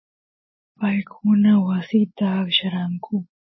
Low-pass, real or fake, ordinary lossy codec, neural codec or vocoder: 7.2 kHz; real; MP3, 24 kbps; none